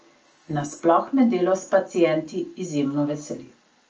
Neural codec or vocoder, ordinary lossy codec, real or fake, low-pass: none; Opus, 24 kbps; real; 7.2 kHz